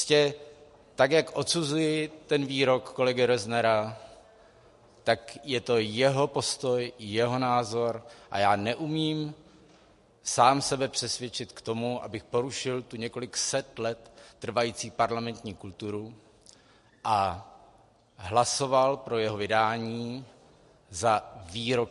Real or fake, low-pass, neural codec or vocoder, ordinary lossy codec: real; 14.4 kHz; none; MP3, 48 kbps